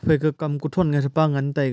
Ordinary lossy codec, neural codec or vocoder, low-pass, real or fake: none; none; none; real